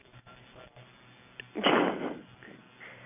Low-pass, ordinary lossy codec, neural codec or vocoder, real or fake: 3.6 kHz; none; none; real